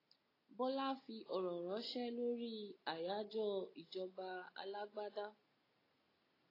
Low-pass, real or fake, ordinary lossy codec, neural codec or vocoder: 5.4 kHz; real; AAC, 24 kbps; none